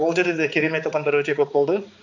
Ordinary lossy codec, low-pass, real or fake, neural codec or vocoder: none; 7.2 kHz; fake; codec, 16 kHz, 4 kbps, X-Codec, HuBERT features, trained on balanced general audio